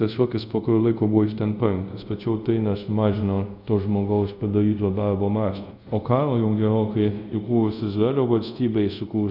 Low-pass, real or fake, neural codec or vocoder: 5.4 kHz; fake; codec, 24 kHz, 0.5 kbps, DualCodec